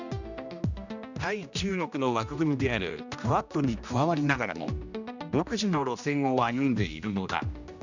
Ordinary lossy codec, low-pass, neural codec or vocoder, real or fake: none; 7.2 kHz; codec, 16 kHz, 1 kbps, X-Codec, HuBERT features, trained on general audio; fake